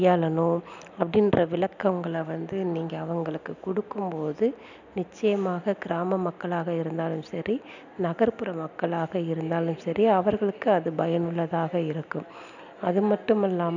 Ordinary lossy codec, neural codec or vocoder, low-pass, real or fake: none; none; 7.2 kHz; real